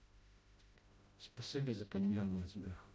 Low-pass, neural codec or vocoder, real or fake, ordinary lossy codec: none; codec, 16 kHz, 0.5 kbps, FreqCodec, smaller model; fake; none